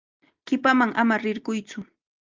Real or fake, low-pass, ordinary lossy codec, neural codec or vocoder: real; 7.2 kHz; Opus, 24 kbps; none